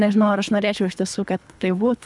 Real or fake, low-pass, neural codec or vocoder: fake; 10.8 kHz; codec, 24 kHz, 3 kbps, HILCodec